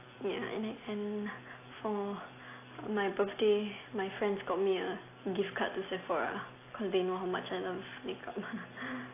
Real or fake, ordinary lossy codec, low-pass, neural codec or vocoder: real; AAC, 24 kbps; 3.6 kHz; none